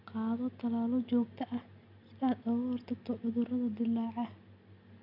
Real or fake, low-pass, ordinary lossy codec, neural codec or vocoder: real; 5.4 kHz; none; none